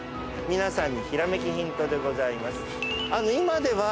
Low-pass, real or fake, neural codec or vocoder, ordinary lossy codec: none; real; none; none